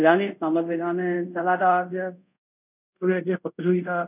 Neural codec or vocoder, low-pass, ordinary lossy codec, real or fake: codec, 24 kHz, 0.5 kbps, DualCodec; 3.6 kHz; none; fake